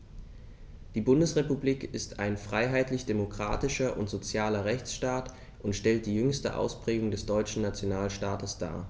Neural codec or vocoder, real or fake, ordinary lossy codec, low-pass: none; real; none; none